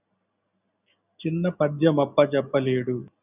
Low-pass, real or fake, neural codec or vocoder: 3.6 kHz; real; none